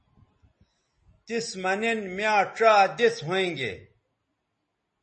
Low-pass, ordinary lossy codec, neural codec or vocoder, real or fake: 10.8 kHz; MP3, 32 kbps; none; real